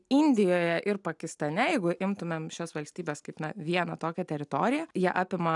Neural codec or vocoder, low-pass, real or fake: none; 10.8 kHz; real